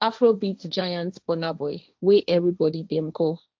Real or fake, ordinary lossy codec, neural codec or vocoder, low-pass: fake; none; codec, 16 kHz, 1.1 kbps, Voila-Tokenizer; none